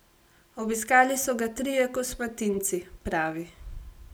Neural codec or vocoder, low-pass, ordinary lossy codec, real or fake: none; none; none; real